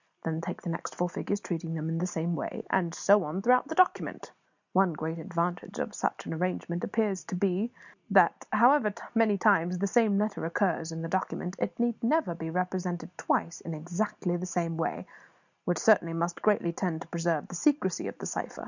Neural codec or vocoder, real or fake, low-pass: none; real; 7.2 kHz